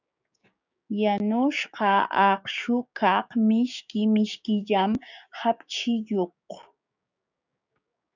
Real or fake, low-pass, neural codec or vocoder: fake; 7.2 kHz; codec, 16 kHz, 6 kbps, DAC